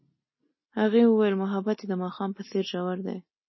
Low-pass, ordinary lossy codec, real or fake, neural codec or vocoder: 7.2 kHz; MP3, 24 kbps; real; none